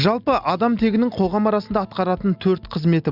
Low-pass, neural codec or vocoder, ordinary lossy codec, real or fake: 5.4 kHz; none; Opus, 64 kbps; real